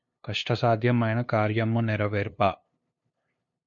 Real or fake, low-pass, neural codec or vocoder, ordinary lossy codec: fake; 7.2 kHz; codec, 16 kHz, 2 kbps, FunCodec, trained on LibriTTS, 25 frames a second; MP3, 48 kbps